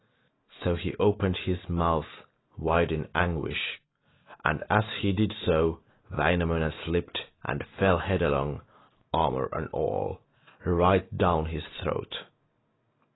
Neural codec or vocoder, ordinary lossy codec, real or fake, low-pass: none; AAC, 16 kbps; real; 7.2 kHz